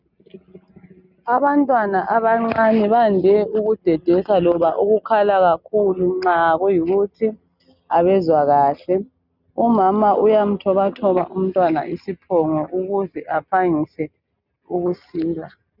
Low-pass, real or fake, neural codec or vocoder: 5.4 kHz; real; none